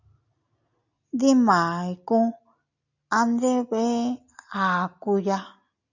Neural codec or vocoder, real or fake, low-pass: none; real; 7.2 kHz